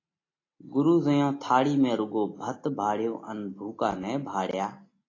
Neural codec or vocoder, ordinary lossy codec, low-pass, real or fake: none; AAC, 32 kbps; 7.2 kHz; real